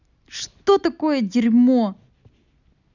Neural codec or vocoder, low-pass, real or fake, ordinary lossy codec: none; 7.2 kHz; real; none